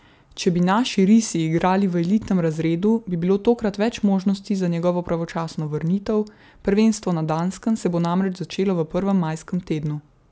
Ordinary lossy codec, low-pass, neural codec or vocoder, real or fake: none; none; none; real